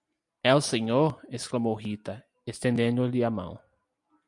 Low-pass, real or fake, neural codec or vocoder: 10.8 kHz; real; none